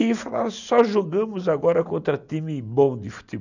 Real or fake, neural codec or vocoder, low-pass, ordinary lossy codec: real; none; 7.2 kHz; none